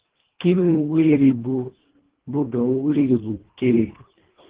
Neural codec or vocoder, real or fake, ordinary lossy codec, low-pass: codec, 24 kHz, 1.5 kbps, HILCodec; fake; Opus, 16 kbps; 3.6 kHz